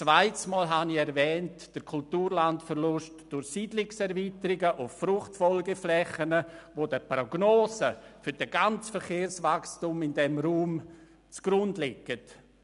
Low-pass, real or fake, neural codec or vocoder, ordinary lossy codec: 10.8 kHz; real; none; none